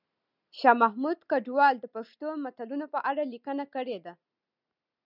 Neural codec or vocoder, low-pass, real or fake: none; 5.4 kHz; real